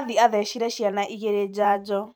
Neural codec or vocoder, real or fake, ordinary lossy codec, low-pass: vocoder, 44.1 kHz, 128 mel bands every 512 samples, BigVGAN v2; fake; none; none